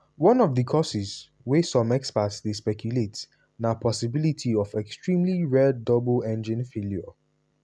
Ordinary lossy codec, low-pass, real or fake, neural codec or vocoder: none; none; real; none